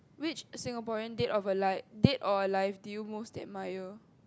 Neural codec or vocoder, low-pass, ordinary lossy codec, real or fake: none; none; none; real